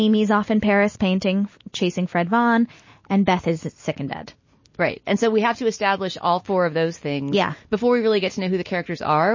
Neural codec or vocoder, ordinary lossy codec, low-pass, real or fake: none; MP3, 32 kbps; 7.2 kHz; real